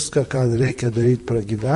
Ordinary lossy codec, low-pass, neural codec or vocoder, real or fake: MP3, 48 kbps; 14.4 kHz; vocoder, 44.1 kHz, 128 mel bands, Pupu-Vocoder; fake